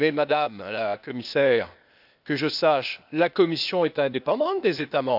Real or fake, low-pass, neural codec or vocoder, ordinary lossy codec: fake; 5.4 kHz; codec, 16 kHz, 0.8 kbps, ZipCodec; none